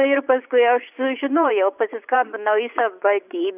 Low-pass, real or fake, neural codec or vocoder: 3.6 kHz; fake; vocoder, 24 kHz, 100 mel bands, Vocos